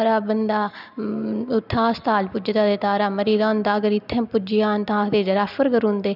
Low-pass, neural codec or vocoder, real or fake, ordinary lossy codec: 5.4 kHz; none; real; none